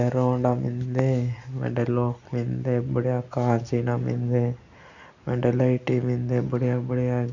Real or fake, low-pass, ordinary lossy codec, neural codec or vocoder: real; 7.2 kHz; none; none